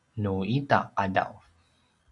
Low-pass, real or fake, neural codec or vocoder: 10.8 kHz; real; none